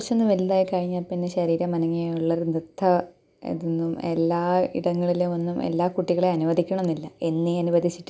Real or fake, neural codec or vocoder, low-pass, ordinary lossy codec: real; none; none; none